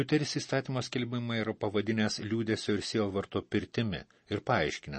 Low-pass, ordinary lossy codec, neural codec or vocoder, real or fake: 10.8 kHz; MP3, 32 kbps; none; real